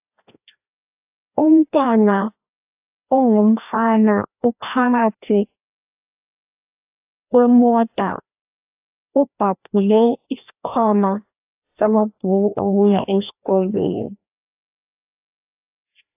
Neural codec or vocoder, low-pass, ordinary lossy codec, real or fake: codec, 16 kHz, 1 kbps, FreqCodec, larger model; 3.6 kHz; AAC, 32 kbps; fake